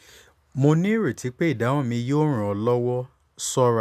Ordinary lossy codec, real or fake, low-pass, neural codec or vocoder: none; real; 14.4 kHz; none